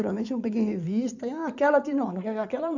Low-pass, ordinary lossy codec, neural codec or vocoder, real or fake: 7.2 kHz; none; codec, 16 kHz in and 24 kHz out, 2.2 kbps, FireRedTTS-2 codec; fake